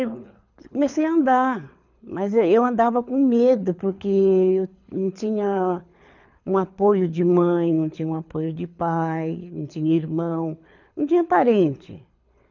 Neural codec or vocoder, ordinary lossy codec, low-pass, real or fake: codec, 24 kHz, 6 kbps, HILCodec; none; 7.2 kHz; fake